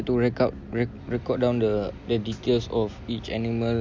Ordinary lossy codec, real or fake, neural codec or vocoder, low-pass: none; real; none; none